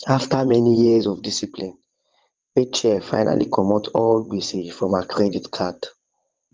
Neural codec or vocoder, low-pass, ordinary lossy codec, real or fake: vocoder, 22.05 kHz, 80 mel bands, WaveNeXt; 7.2 kHz; Opus, 32 kbps; fake